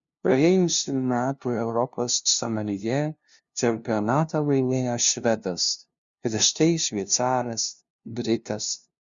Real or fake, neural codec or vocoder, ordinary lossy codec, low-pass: fake; codec, 16 kHz, 0.5 kbps, FunCodec, trained on LibriTTS, 25 frames a second; Opus, 64 kbps; 7.2 kHz